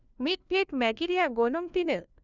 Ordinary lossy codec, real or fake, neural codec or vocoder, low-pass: none; fake; codec, 16 kHz, 1 kbps, FunCodec, trained on LibriTTS, 50 frames a second; 7.2 kHz